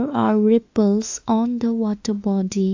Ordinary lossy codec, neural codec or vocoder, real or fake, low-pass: AAC, 48 kbps; autoencoder, 48 kHz, 32 numbers a frame, DAC-VAE, trained on Japanese speech; fake; 7.2 kHz